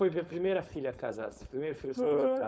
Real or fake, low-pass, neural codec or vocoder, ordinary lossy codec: fake; none; codec, 16 kHz, 4.8 kbps, FACodec; none